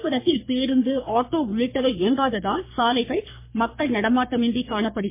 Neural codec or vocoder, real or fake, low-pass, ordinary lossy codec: codec, 44.1 kHz, 3.4 kbps, Pupu-Codec; fake; 3.6 kHz; MP3, 24 kbps